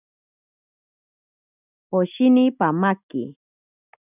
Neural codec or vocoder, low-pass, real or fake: none; 3.6 kHz; real